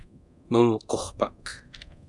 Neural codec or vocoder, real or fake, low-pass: codec, 24 kHz, 0.9 kbps, DualCodec; fake; 10.8 kHz